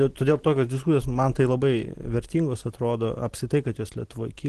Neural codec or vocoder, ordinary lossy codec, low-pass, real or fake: none; Opus, 16 kbps; 10.8 kHz; real